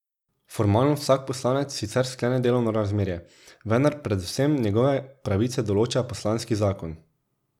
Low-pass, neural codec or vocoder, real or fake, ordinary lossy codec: 19.8 kHz; none; real; none